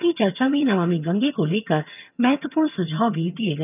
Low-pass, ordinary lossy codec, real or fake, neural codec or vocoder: 3.6 kHz; none; fake; vocoder, 22.05 kHz, 80 mel bands, HiFi-GAN